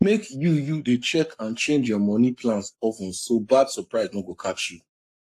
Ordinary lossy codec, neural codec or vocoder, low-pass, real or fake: AAC, 64 kbps; codec, 44.1 kHz, 7.8 kbps, Pupu-Codec; 14.4 kHz; fake